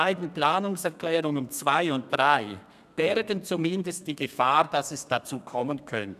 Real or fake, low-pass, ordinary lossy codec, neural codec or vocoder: fake; 14.4 kHz; none; codec, 32 kHz, 1.9 kbps, SNAC